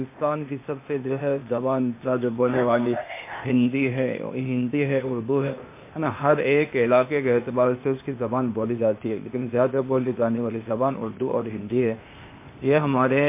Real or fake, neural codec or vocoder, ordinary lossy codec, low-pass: fake; codec, 16 kHz, 0.8 kbps, ZipCodec; MP3, 24 kbps; 3.6 kHz